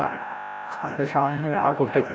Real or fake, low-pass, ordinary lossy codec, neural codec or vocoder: fake; none; none; codec, 16 kHz, 0.5 kbps, FreqCodec, larger model